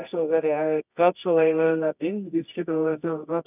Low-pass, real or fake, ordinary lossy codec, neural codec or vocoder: 3.6 kHz; fake; none; codec, 24 kHz, 0.9 kbps, WavTokenizer, medium music audio release